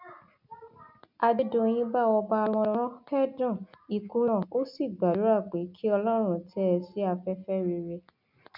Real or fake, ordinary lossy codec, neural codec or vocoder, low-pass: real; none; none; 5.4 kHz